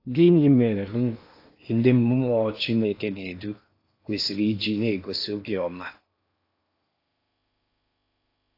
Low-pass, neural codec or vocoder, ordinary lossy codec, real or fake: 5.4 kHz; codec, 16 kHz in and 24 kHz out, 0.8 kbps, FocalCodec, streaming, 65536 codes; AAC, 32 kbps; fake